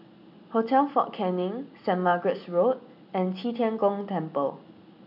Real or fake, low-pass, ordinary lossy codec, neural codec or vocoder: real; 5.4 kHz; none; none